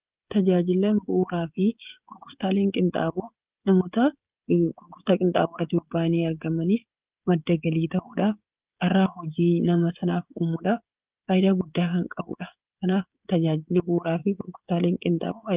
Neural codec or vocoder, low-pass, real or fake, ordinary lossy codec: codec, 16 kHz, 16 kbps, FreqCodec, smaller model; 3.6 kHz; fake; Opus, 32 kbps